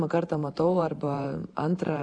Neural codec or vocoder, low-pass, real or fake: vocoder, 44.1 kHz, 128 mel bands every 512 samples, BigVGAN v2; 9.9 kHz; fake